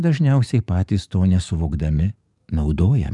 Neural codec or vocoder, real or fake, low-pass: codec, 44.1 kHz, 7.8 kbps, DAC; fake; 10.8 kHz